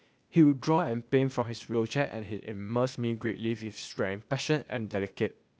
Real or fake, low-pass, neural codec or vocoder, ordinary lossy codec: fake; none; codec, 16 kHz, 0.8 kbps, ZipCodec; none